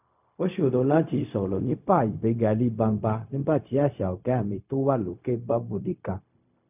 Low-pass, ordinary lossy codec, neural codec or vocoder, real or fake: 3.6 kHz; none; codec, 16 kHz, 0.4 kbps, LongCat-Audio-Codec; fake